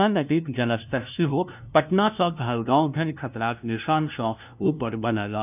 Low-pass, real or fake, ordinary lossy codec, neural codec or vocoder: 3.6 kHz; fake; none; codec, 16 kHz, 0.5 kbps, FunCodec, trained on LibriTTS, 25 frames a second